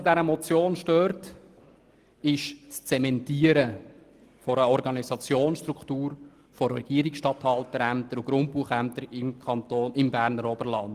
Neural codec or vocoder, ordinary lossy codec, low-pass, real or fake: none; Opus, 24 kbps; 14.4 kHz; real